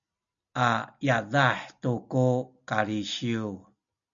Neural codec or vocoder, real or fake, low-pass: none; real; 7.2 kHz